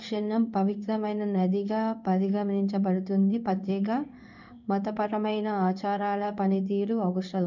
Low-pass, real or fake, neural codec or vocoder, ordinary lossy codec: 7.2 kHz; fake; codec, 16 kHz in and 24 kHz out, 1 kbps, XY-Tokenizer; none